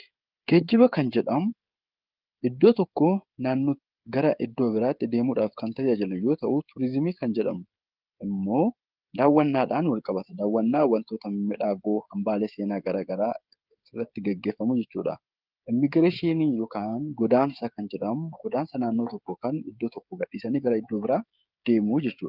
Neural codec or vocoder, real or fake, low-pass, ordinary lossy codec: codec, 16 kHz, 16 kbps, FreqCodec, smaller model; fake; 5.4 kHz; Opus, 32 kbps